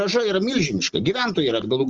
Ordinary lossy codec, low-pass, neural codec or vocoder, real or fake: Opus, 24 kbps; 7.2 kHz; none; real